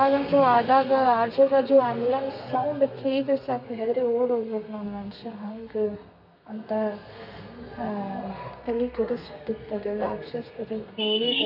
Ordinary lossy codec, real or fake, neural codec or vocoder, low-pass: MP3, 48 kbps; fake; codec, 32 kHz, 1.9 kbps, SNAC; 5.4 kHz